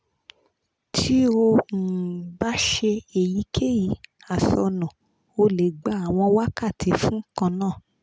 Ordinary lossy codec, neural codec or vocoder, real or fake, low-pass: none; none; real; none